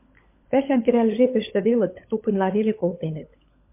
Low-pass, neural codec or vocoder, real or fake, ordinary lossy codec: 3.6 kHz; codec, 16 kHz, 16 kbps, FunCodec, trained on LibriTTS, 50 frames a second; fake; MP3, 24 kbps